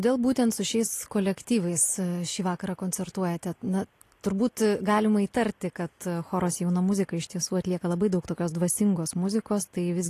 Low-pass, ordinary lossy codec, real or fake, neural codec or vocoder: 14.4 kHz; AAC, 48 kbps; real; none